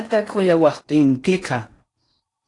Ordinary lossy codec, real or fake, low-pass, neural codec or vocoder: AAC, 48 kbps; fake; 10.8 kHz; codec, 16 kHz in and 24 kHz out, 0.6 kbps, FocalCodec, streaming, 4096 codes